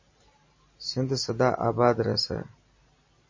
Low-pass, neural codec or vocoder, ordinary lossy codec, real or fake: 7.2 kHz; none; MP3, 32 kbps; real